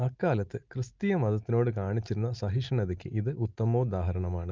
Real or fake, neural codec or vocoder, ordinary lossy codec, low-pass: real; none; Opus, 32 kbps; 7.2 kHz